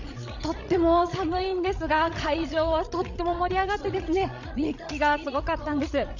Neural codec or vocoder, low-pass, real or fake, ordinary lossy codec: codec, 16 kHz, 16 kbps, FreqCodec, larger model; 7.2 kHz; fake; none